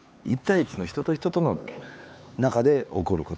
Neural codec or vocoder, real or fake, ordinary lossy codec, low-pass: codec, 16 kHz, 4 kbps, X-Codec, HuBERT features, trained on LibriSpeech; fake; none; none